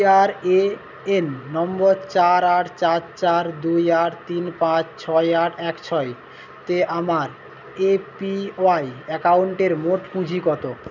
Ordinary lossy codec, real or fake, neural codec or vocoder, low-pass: none; real; none; 7.2 kHz